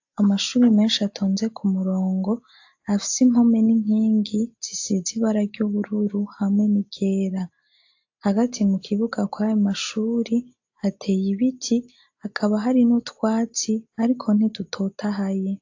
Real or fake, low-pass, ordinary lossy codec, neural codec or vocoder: real; 7.2 kHz; AAC, 48 kbps; none